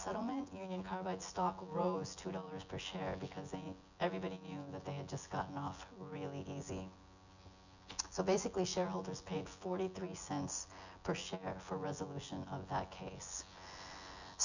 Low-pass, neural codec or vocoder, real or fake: 7.2 kHz; vocoder, 24 kHz, 100 mel bands, Vocos; fake